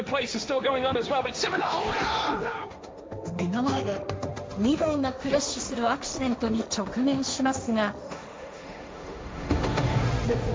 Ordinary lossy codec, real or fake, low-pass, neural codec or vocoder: none; fake; none; codec, 16 kHz, 1.1 kbps, Voila-Tokenizer